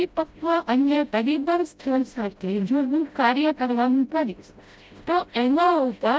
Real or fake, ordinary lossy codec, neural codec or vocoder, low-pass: fake; none; codec, 16 kHz, 0.5 kbps, FreqCodec, smaller model; none